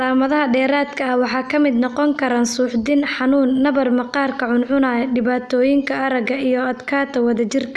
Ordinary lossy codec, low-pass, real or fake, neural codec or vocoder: none; none; real; none